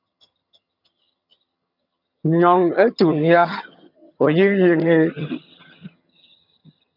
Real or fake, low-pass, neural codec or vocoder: fake; 5.4 kHz; vocoder, 22.05 kHz, 80 mel bands, HiFi-GAN